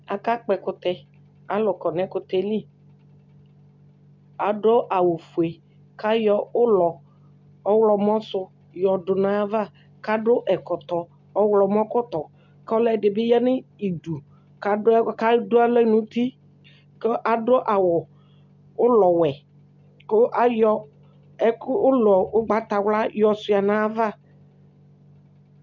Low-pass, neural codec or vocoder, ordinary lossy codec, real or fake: 7.2 kHz; none; MP3, 64 kbps; real